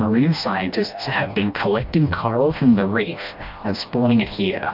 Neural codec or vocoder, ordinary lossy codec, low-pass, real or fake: codec, 16 kHz, 1 kbps, FreqCodec, smaller model; MP3, 48 kbps; 5.4 kHz; fake